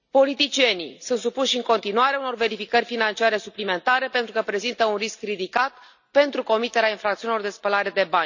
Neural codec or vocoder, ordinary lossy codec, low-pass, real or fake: none; none; 7.2 kHz; real